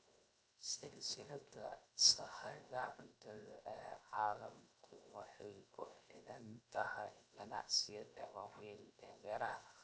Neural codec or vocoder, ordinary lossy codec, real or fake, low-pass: codec, 16 kHz, 0.7 kbps, FocalCodec; none; fake; none